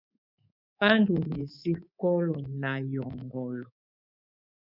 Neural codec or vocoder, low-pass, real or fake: codec, 24 kHz, 3.1 kbps, DualCodec; 5.4 kHz; fake